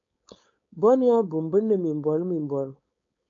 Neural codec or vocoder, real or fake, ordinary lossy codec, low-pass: codec, 16 kHz, 4.8 kbps, FACodec; fake; AAC, 48 kbps; 7.2 kHz